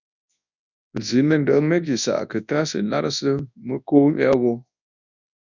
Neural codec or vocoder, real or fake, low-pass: codec, 24 kHz, 0.9 kbps, WavTokenizer, large speech release; fake; 7.2 kHz